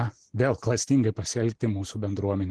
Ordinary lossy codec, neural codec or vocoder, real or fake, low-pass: Opus, 16 kbps; none; real; 10.8 kHz